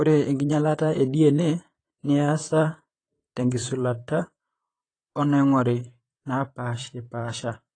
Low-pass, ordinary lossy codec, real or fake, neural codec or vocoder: 9.9 kHz; AAC, 32 kbps; fake; vocoder, 44.1 kHz, 128 mel bands, Pupu-Vocoder